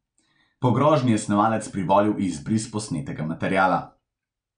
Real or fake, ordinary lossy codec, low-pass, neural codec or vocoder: real; none; 9.9 kHz; none